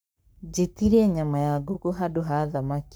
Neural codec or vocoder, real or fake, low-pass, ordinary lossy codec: codec, 44.1 kHz, 7.8 kbps, Pupu-Codec; fake; none; none